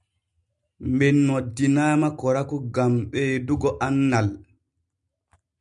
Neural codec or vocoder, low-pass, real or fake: none; 10.8 kHz; real